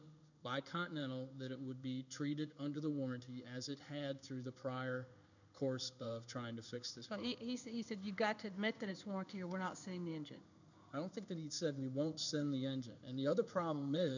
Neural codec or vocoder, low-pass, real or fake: codec, 16 kHz in and 24 kHz out, 1 kbps, XY-Tokenizer; 7.2 kHz; fake